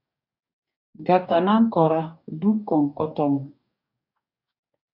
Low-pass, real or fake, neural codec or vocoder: 5.4 kHz; fake; codec, 44.1 kHz, 2.6 kbps, DAC